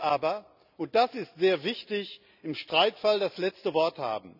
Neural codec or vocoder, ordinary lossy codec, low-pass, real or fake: none; none; 5.4 kHz; real